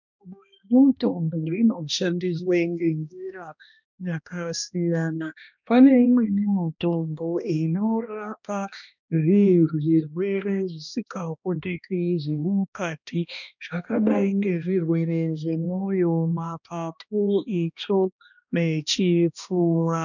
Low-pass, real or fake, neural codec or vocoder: 7.2 kHz; fake; codec, 16 kHz, 1 kbps, X-Codec, HuBERT features, trained on balanced general audio